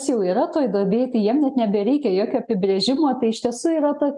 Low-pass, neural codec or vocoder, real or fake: 10.8 kHz; none; real